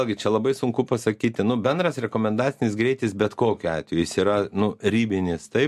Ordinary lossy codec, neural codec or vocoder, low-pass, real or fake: MP3, 64 kbps; none; 14.4 kHz; real